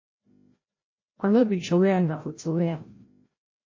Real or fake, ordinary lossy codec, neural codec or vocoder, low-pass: fake; MP3, 32 kbps; codec, 16 kHz, 0.5 kbps, FreqCodec, larger model; 7.2 kHz